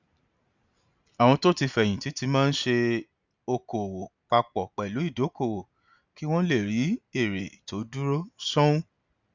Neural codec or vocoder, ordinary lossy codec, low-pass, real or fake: none; none; 7.2 kHz; real